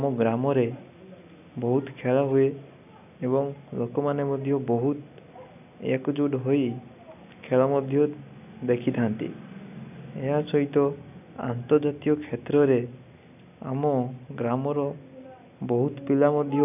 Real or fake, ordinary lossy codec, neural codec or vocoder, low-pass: real; none; none; 3.6 kHz